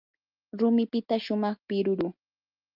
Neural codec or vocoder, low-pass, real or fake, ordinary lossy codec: none; 5.4 kHz; real; Opus, 24 kbps